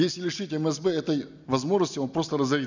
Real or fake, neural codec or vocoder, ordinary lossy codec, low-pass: real; none; none; 7.2 kHz